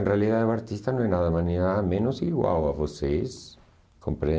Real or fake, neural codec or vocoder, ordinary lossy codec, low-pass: real; none; none; none